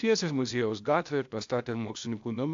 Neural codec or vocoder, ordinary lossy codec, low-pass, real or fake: codec, 16 kHz, 0.8 kbps, ZipCodec; AAC, 64 kbps; 7.2 kHz; fake